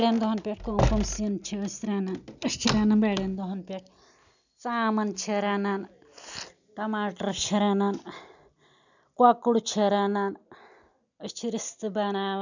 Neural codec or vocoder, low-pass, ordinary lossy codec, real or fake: none; 7.2 kHz; none; real